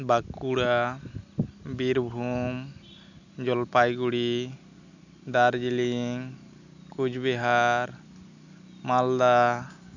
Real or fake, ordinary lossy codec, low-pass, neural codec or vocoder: real; none; 7.2 kHz; none